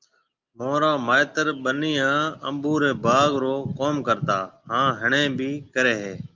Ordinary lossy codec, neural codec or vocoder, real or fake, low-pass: Opus, 32 kbps; none; real; 7.2 kHz